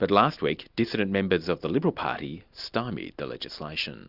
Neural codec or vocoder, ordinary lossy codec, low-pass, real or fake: none; Opus, 64 kbps; 5.4 kHz; real